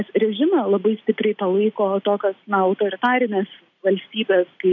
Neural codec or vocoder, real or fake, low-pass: none; real; 7.2 kHz